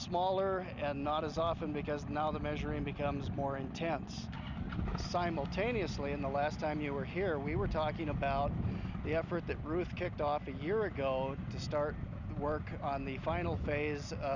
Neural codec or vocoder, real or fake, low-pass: none; real; 7.2 kHz